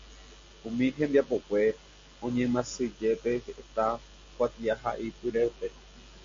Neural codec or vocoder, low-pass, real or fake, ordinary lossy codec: none; 7.2 kHz; real; MP3, 48 kbps